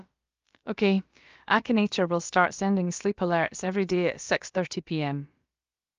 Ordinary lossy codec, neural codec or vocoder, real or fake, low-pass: Opus, 32 kbps; codec, 16 kHz, about 1 kbps, DyCAST, with the encoder's durations; fake; 7.2 kHz